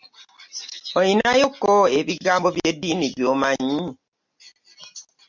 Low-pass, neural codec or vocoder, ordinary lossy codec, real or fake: 7.2 kHz; none; MP3, 64 kbps; real